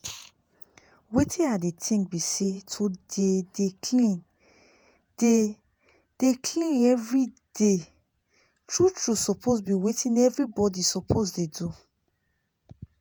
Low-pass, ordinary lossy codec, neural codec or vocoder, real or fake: none; none; vocoder, 48 kHz, 128 mel bands, Vocos; fake